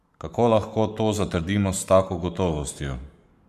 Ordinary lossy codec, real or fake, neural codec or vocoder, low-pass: none; fake; codec, 44.1 kHz, 7.8 kbps, Pupu-Codec; 14.4 kHz